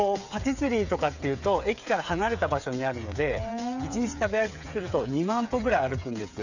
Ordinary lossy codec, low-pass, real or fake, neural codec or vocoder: none; 7.2 kHz; fake; codec, 16 kHz, 8 kbps, FreqCodec, smaller model